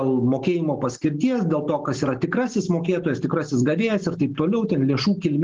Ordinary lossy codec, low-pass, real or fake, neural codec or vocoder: Opus, 16 kbps; 7.2 kHz; real; none